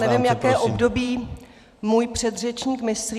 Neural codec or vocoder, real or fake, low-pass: vocoder, 44.1 kHz, 128 mel bands every 256 samples, BigVGAN v2; fake; 14.4 kHz